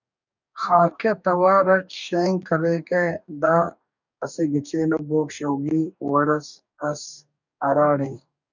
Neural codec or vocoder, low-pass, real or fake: codec, 44.1 kHz, 2.6 kbps, DAC; 7.2 kHz; fake